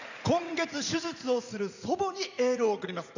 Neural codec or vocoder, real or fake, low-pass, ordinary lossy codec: vocoder, 44.1 kHz, 80 mel bands, Vocos; fake; 7.2 kHz; none